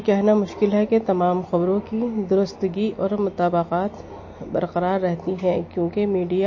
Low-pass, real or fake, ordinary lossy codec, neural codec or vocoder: 7.2 kHz; real; MP3, 32 kbps; none